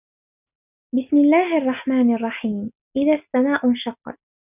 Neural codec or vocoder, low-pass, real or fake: none; 3.6 kHz; real